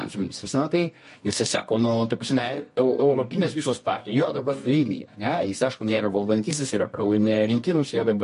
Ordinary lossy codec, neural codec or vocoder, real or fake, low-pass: MP3, 48 kbps; codec, 24 kHz, 0.9 kbps, WavTokenizer, medium music audio release; fake; 10.8 kHz